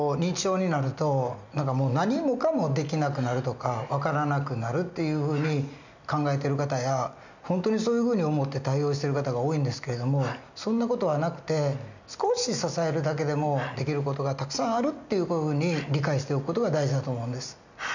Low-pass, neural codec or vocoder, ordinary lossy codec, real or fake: 7.2 kHz; none; none; real